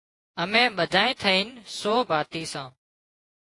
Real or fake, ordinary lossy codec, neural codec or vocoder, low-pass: fake; AAC, 48 kbps; vocoder, 48 kHz, 128 mel bands, Vocos; 10.8 kHz